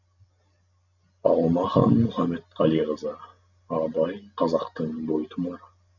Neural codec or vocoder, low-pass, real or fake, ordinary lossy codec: none; 7.2 kHz; real; none